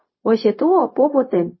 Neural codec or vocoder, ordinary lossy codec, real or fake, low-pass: codec, 16 kHz, 0.4 kbps, LongCat-Audio-Codec; MP3, 24 kbps; fake; 7.2 kHz